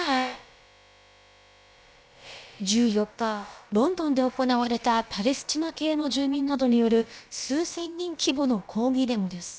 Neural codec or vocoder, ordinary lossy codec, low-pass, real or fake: codec, 16 kHz, about 1 kbps, DyCAST, with the encoder's durations; none; none; fake